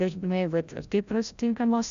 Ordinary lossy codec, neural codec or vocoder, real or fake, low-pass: AAC, 96 kbps; codec, 16 kHz, 0.5 kbps, FreqCodec, larger model; fake; 7.2 kHz